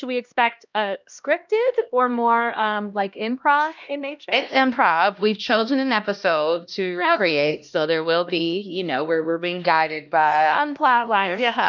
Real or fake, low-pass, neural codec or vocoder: fake; 7.2 kHz; codec, 16 kHz, 1 kbps, X-Codec, HuBERT features, trained on LibriSpeech